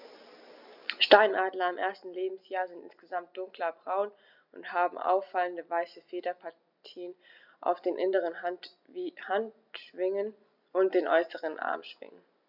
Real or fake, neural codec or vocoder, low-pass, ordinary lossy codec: real; none; 5.4 kHz; none